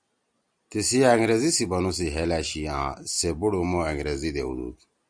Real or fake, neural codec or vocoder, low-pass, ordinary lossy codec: real; none; 9.9 kHz; Opus, 64 kbps